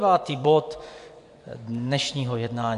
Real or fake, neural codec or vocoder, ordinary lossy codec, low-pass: fake; vocoder, 24 kHz, 100 mel bands, Vocos; MP3, 96 kbps; 10.8 kHz